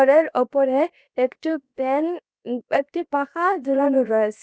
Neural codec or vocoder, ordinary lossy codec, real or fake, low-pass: codec, 16 kHz, 0.7 kbps, FocalCodec; none; fake; none